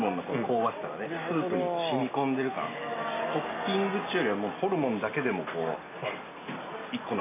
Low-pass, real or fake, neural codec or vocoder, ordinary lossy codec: 3.6 kHz; real; none; MP3, 24 kbps